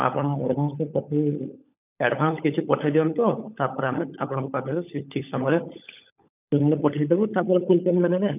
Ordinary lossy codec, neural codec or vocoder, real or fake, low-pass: none; codec, 16 kHz, 16 kbps, FunCodec, trained on LibriTTS, 50 frames a second; fake; 3.6 kHz